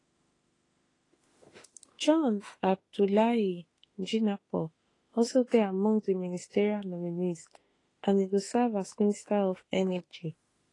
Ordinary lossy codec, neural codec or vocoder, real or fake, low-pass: AAC, 32 kbps; autoencoder, 48 kHz, 32 numbers a frame, DAC-VAE, trained on Japanese speech; fake; 10.8 kHz